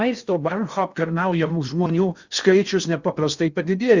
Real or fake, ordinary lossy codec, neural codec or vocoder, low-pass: fake; Opus, 64 kbps; codec, 16 kHz in and 24 kHz out, 0.6 kbps, FocalCodec, streaming, 2048 codes; 7.2 kHz